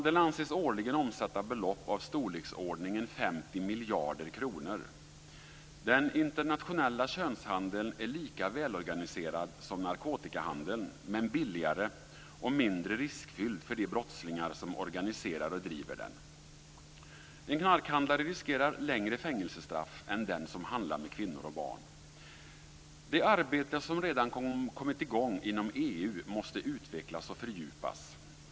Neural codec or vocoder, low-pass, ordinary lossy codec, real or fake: none; none; none; real